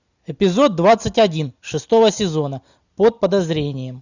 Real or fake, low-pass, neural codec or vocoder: real; 7.2 kHz; none